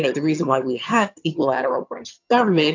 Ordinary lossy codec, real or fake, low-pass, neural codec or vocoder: AAC, 48 kbps; fake; 7.2 kHz; vocoder, 22.05 kHz, 80 mel bands, HiFi-GAN